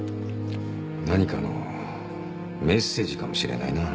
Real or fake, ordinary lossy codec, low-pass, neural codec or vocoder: real; none; none; none